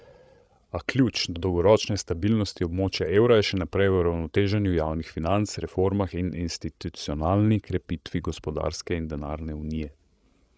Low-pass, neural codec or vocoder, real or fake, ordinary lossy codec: none; codec, 16 kHz, 16 kbps, FreqCodec, larger model; fake; none